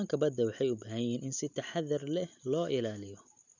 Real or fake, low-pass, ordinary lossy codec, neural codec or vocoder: real; 7.2 kHz; none; none